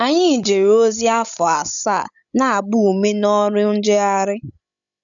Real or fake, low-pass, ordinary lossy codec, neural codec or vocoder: fake; 7.2 kHz; none; codec, 16 kHz, 16 kbps, FreqCodec, larger model